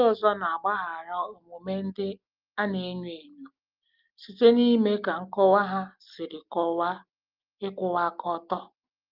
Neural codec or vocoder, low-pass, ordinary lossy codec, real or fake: none; 5.4 kHz; Opus, 32 kbps; real